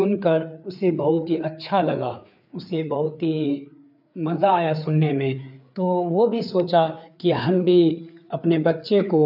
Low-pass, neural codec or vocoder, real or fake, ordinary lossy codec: 5.4 kHz; codec, 16 kHz, 4 kbps, FreqCodec, larger model; fake; none